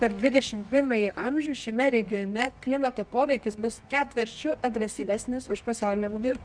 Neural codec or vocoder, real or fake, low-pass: codec, 24 kHz, 0.9 kbps, WavTokenizer, medium music audio release; fake; 9.9 kHz